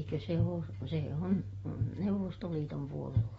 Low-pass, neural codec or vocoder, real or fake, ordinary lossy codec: 19.8 kHz; none; real; AAC, 24 kbps